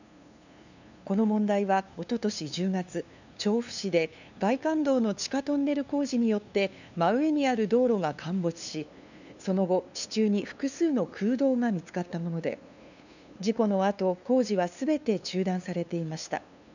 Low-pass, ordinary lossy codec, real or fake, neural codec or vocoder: 7.2 kHz; none; fake; codec, 16 kHz, 2 kbps, FunCodec, trained on LibriTTS, 25 frames a second